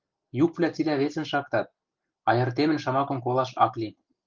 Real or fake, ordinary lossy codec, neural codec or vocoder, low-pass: real; Opus, 32 kbps; none; 7.2 kHz